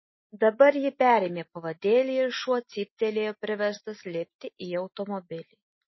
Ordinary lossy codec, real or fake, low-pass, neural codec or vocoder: MP3, 24 kbps; real; 7.2 kHz; none